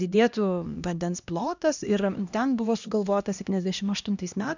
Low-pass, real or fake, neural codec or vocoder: 7.2 kHz; fake; codec, 16 kHz, 1 kbps, X-Codec, HuBERT features, trained on LibriSpeech